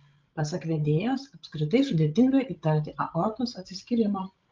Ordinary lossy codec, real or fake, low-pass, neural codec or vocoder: Opus, 32 kbps; fake; 7.2 kHz; codec, 16 kHz, 16 kbps, FreqCodec, larger model